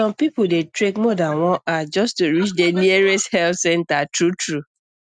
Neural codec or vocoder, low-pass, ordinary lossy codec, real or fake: none; 9.9 kHz; none; real